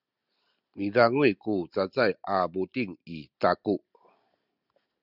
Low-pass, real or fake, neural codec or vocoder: 5.4 kHz; real; none